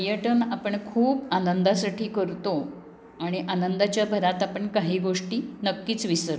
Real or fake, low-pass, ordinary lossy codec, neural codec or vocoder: real; none; none; none